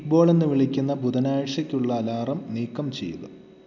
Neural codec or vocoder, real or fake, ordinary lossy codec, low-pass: none; real; none; 7.2 kHz